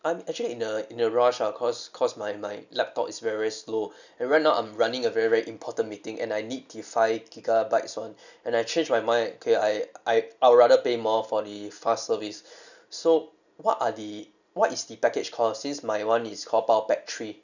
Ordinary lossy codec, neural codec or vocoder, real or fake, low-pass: none; none; real; 7.2 kHz